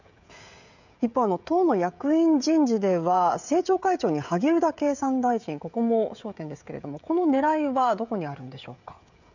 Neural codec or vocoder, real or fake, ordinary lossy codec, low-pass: codec, 16 kHz, 16 kbps, FreqCodec, smaller model; fake; none; 7.2 kHz